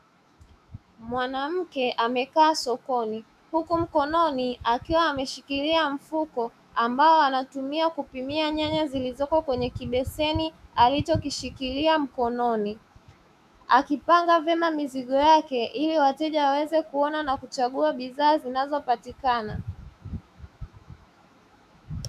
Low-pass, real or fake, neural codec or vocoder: 14.4 kHz; fake; autoencoder, 48 kHz, 128 numbers a frame, DAC-VAE, trained on Japanese speech